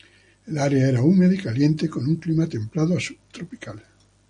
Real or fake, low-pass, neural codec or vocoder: real; 9.9 kHz; none